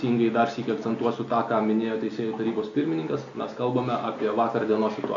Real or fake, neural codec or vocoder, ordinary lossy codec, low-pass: real; none; AAC, 48 kbps; 7.2 kHz